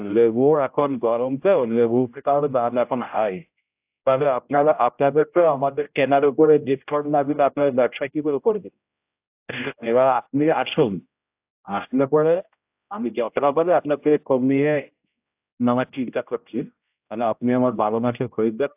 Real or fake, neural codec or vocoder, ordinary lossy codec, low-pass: fake; codec, 16 kHz, 0.5 kbps, X-Codec, HuBERT features, trained on general audio; AAC, 32 kbps; 3.6 kHz